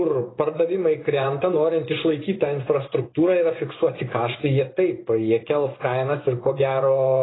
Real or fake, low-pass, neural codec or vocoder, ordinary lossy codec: fake; 7.2 kHz; vocoder, 24 kHz, 100 mel bands, Vocos; AAC, 16 kbps